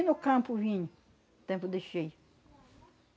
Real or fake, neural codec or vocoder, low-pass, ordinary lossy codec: real; none; none; none